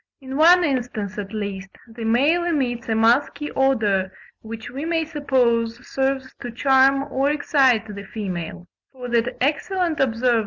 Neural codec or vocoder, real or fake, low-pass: none; real; 7.2 kHz